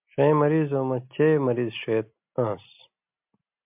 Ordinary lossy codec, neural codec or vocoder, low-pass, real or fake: MP3, 32 kbps; none; 3.6 kHz; real